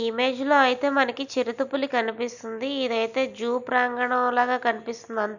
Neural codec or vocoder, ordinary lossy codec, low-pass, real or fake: none; none; 7.2 kHz; real